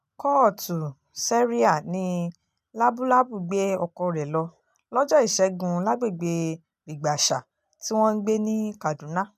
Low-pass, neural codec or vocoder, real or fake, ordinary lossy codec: 14.4 kHz; none; real; none